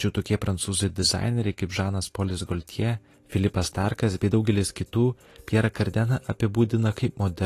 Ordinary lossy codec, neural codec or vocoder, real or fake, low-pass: AAC, 48 kbps; none; real; 14.4 kHz